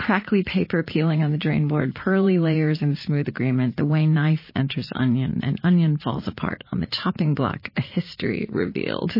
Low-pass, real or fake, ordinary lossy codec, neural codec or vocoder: 5.4 kHz; fake; MP3, 24 kbps; vocoder, 44.1 kHz, 80 mel bands, Vocos